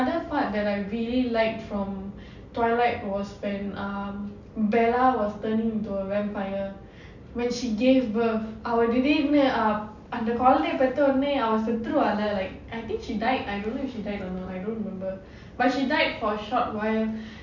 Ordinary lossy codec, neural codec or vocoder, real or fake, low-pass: none; none; real; 7.2 kHz